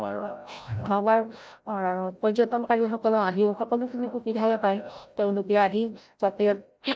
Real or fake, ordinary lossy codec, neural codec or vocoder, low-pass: fake; none; codec, 16 kHz, 0.5 kbps, FreqCodec, larger model; none